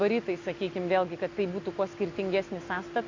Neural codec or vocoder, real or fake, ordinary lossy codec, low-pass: none; real; AAC, 48 kbps; 7.2 kHz